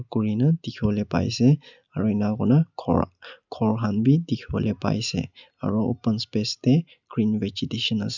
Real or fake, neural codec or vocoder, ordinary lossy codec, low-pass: real; none; none; 7.2 kHz